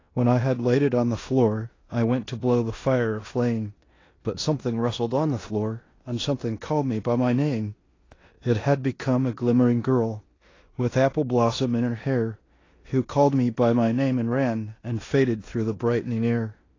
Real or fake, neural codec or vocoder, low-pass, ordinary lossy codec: fake; codec, 16 kHz in and 24 kHz out, 0.9 kbps, LongCat-Audio-Codec, four codebook decoder; 7.2 kHz; AAC, 32 kbps